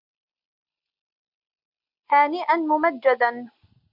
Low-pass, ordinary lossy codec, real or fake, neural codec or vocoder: 5.4 kHz; MP3, 48 kbps; real; none